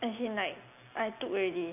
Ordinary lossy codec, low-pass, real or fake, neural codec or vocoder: none; 3.6 kHz; real; none